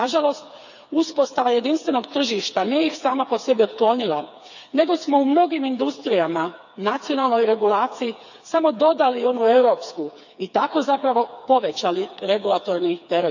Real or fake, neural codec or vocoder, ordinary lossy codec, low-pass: fake; codec, 16 kHz, 4 kbps, FreqCodec, smaller model; none; 7.2 kHz